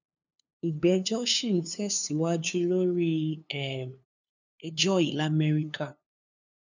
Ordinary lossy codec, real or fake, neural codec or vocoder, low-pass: none; fake; codec, 16 kHz, 2 kbps, FunCodec, trained on LibriTTS, 25 frames a second; 7.2 kHz